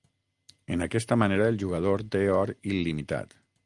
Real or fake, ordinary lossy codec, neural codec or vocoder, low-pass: real; Opus, 32 kbps; none; 10.8 kHz